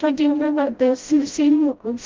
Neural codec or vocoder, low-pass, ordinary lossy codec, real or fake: codec, 16 kHz, 0.5 kbps, FreqCodec, smaller model; 7.2 kHz; Opus, 24 kbps; fake